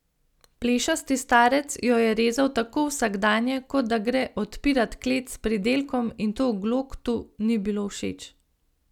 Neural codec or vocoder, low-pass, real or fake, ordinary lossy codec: none; 19.8 kHz; real; none